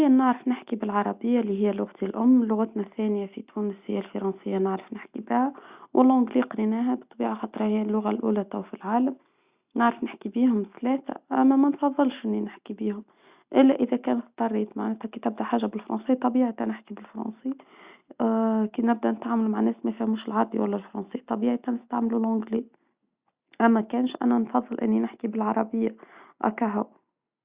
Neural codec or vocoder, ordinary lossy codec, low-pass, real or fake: none; Opus, 64 kbps; 3.6 kHz; real